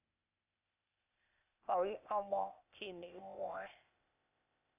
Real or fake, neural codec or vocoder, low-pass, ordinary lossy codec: fake; codec, 16 kHz, 0.8 kbps, ZipCodec; 3.6 kHz; none